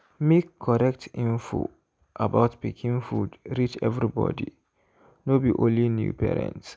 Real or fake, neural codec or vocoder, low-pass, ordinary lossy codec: real; none; none; none